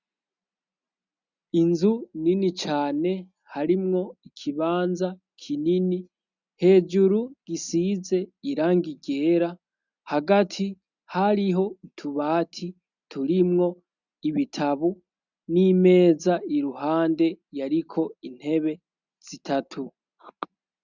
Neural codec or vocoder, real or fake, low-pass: none; real; 7.2 kHz